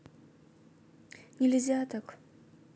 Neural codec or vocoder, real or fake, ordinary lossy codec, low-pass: none; real; none; none